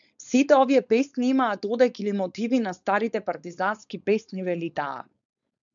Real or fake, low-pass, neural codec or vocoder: fake; 7.2 kHz; codec, 16 kHz, 4.8 kbps, FACodec